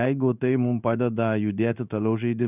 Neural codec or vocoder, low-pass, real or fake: codec, 16 kHz in and 24 kHz out, 1 kbps, XY-Tokenizer; 3.6 kHz; fake